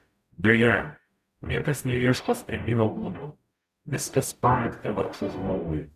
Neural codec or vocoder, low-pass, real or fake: codec, 44.1 kHz, 0.9 kbps, DAC; 14.4 kHz; fake